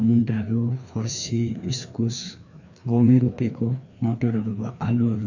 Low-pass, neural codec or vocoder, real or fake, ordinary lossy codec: 7.2 kHz; codec, 16 kHz, 2 kbps, FreqCodec, larger model; fake; none